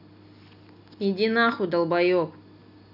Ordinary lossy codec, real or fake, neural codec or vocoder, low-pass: none; real; none; 5.4 kHz